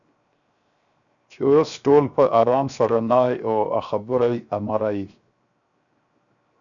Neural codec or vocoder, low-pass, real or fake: codec, 16 kHz, 0.7 kbps, FocalCodec; 7.2 kHz; fake